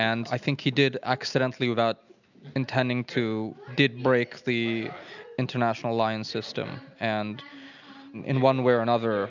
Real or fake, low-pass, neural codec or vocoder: real; 7.2 kHz; none